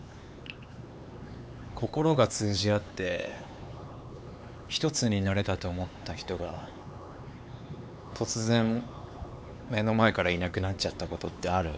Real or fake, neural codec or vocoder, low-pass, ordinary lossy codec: fake; codec, 16 kHz, 4 kbps, X-Codec, HuBERT features, trained on LibriSpeech; none; none